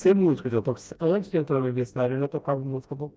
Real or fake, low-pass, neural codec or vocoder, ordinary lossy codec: fake; none; codec, 16 kHz, 1 kbps, FreqCodec, smaller model; none